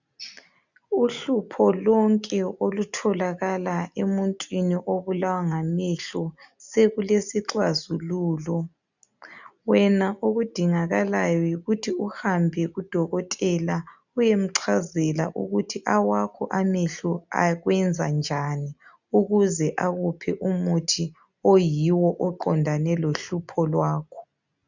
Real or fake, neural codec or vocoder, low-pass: real; none; 7.2 kHz